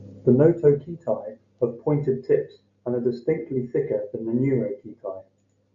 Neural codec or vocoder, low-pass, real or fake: none; 7.2 kHz; real